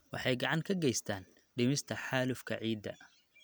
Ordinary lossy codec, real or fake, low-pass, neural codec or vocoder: none; real; none; none